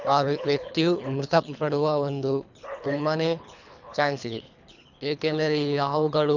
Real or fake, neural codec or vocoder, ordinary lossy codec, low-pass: fake; codec, 24 kHz, 3 kbps, HILCodec; none; 7.2 kHz